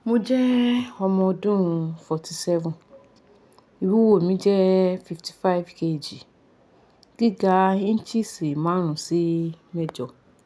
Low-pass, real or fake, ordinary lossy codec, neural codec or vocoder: none; real; none; none